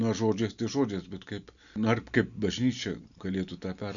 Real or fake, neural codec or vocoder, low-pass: real; none; 7.2 kHz